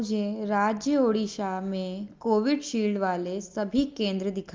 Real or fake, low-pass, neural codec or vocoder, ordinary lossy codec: real; 7.2 kHz; none; Opus, 32 kbps